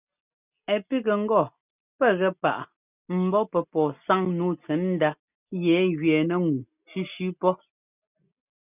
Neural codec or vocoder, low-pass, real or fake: none; 3.6 kHz; real